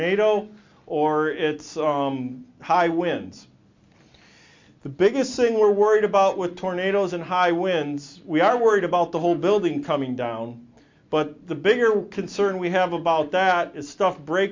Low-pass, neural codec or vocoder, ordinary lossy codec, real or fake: 7.2 kHz; none; MP3, 48 kbps; real